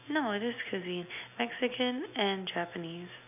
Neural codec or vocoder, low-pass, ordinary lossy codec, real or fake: none; 3.6 kHz; none; real